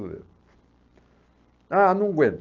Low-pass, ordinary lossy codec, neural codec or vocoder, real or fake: 7.2 kHz; Opus, 32 kbps; none; real